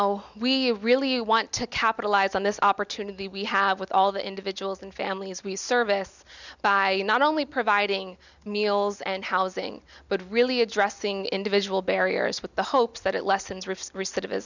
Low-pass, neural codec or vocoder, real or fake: 7.2 kHz; none; real